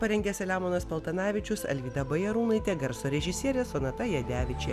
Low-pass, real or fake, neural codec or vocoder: 14.4 kHz; real; none